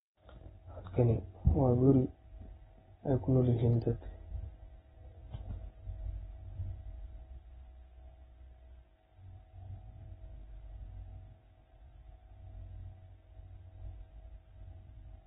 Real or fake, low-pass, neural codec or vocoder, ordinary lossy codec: real; 19.8 kHz; none; AAC, 16 kbps